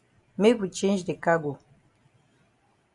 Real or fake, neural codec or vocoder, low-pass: real; none; 10.8 kHz